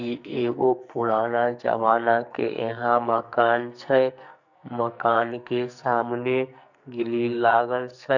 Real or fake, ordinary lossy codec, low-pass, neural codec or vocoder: fake; none; 7.2 kHz; codec, 44.1 kHz, 2.6 kbps, SNAC